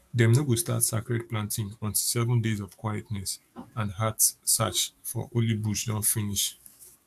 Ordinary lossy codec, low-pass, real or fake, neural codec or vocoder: AAC, 96 kbps; 14.4 kHz; fake; codec, 44.1 kHz, 7.8 kbps, DAC